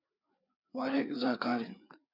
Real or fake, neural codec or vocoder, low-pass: fake; codec, 16 kHz, 4 kbps, FreqCodec, larger model; 5.4 kHz